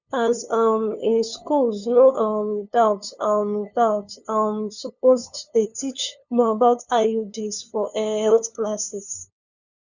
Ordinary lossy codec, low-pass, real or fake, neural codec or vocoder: none; 7.2 kHz; fake; codec, 16 kHz, 2 kbps, FunCodec, trained on LibriTTS, 25 frames a second